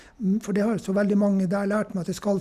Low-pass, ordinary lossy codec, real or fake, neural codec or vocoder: 14.4 kHz; none; real; none